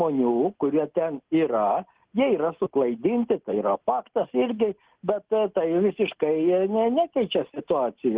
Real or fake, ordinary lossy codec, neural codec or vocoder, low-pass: real; Opus, 16 kbps; none; 3.6 kHz